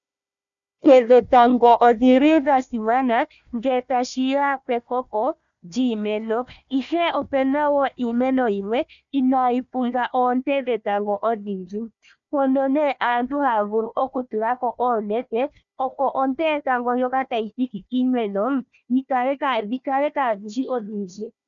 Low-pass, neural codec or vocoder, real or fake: 7.2 kHz; codec, 16 kHz, 1 kbps, FunCodec, trained on Chinese and English, 50 frames a second; fake